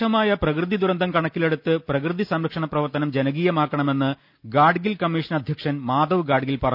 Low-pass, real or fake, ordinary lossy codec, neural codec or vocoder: 5.4 kHz; real; none; none